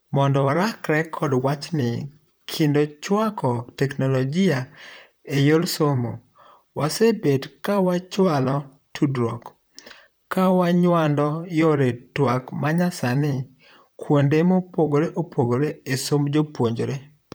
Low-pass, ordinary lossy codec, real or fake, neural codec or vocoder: none; none; fake; vocoder, 44.1 kHz, 128 mel bands, Pupu-Vocoder